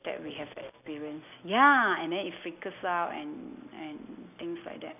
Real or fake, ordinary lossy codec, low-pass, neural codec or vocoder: real; none; 3.6 kHz; none